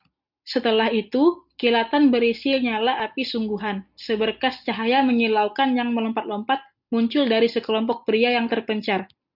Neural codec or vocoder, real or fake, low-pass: none; real; 5.4 kHz